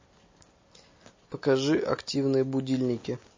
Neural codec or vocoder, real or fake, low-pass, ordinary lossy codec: none; real; 7.2 kHz; MP3, 32 kbps